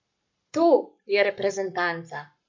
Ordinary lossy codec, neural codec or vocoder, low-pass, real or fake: MP3, 64 kbps; codec, 44.1 kHz, 7.8 kbps, Pupu-Codec; 7.2 kHz; fake